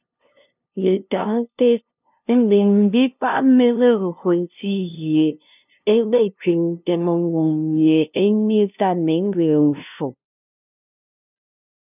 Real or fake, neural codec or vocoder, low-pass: fake; codec, 16 kHz, 0.5 kbps, FunCodec, trained on LibriTTS, 25 frames a second; 3.6 kHz